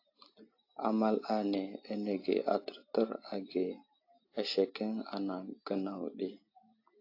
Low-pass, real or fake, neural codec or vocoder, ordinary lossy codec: 5.4 kHz; real; none; AAC, 32 kbps